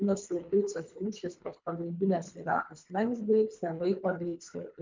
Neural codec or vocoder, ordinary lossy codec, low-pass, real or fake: codec, 24 kHz, 3 kbps, HILCodec; MP3, 64 kbps; 7.2 kHz; fake